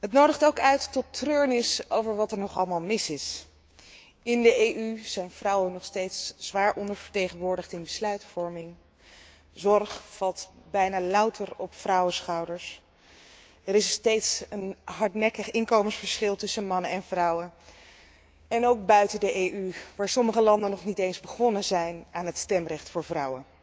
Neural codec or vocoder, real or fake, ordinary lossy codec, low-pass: codec, 16 kHz, 6 kbps, DAC; fake; none; none